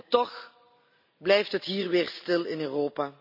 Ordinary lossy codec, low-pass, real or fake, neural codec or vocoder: none; 5.4 kHz; real; none